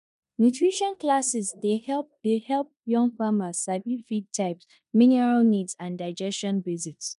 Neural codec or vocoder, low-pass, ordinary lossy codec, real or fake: codec, 16 kHz in and 24 kHz out, 0.9 kbps, LongCat-Audio-Codec, four codebook decoder; 10.8 kHz; none; fake